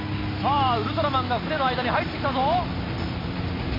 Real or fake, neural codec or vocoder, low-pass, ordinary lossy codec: real; none; 5.4 kHz; MP3, 24 kbps